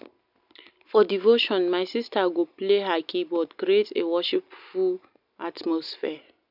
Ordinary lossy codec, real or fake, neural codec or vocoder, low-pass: none; real; none; 5.4 kHz